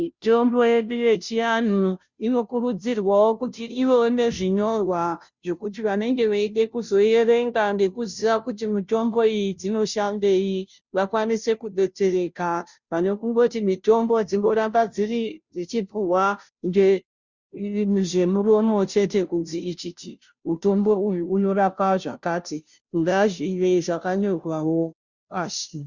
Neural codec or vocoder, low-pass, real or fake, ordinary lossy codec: codec, 16 kHz, 0.5 kbps, FunCodec, trained on Chinese and English, 25 frames a second; 7.2 kHz; fake; Opus, 64 kbps